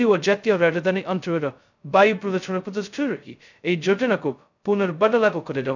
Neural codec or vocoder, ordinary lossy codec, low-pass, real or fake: codec, 16 kHz, 0.2 kbps, FocalCodec; none; 7.2 kHz; fake